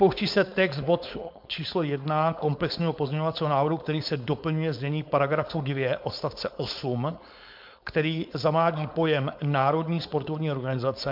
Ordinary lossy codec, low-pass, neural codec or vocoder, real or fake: MP3, 48 kbps; 5.4 kHz; codec, 16 kHz, 4.8 kbps, FACodec; fake